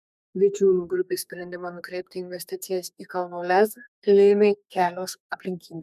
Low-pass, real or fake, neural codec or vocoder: 14.4 kHz; fake; codec, 32 kHz, 1.9 kbps, SNAC